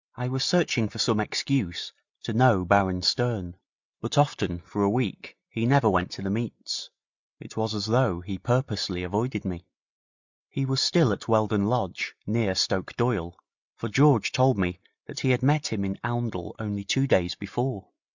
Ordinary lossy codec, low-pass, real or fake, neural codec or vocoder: Opus, 64 kbps; 7.2 kHz; real; none